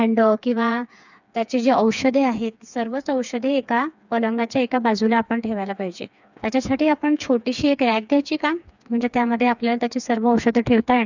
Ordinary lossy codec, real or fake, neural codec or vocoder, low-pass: none; fake; codec, 16 kHz, 4 kbps, FreqCodec, smaller model; 7.2 kHz